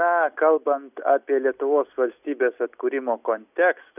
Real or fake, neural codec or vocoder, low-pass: real; none; 3.6 kHz